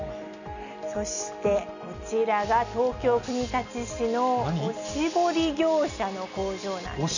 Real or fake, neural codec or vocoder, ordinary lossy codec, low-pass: real; none; none; 7.2 kHz